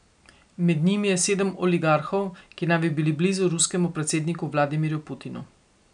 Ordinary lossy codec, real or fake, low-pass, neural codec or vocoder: none; real; 9.9 kHz; none